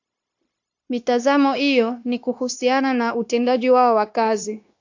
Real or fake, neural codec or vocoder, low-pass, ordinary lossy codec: fake; codec, 16 kHz, 0.9 kbps, LongCat-Audio-Codec; 7.2 kHz; AAC, 48 kbps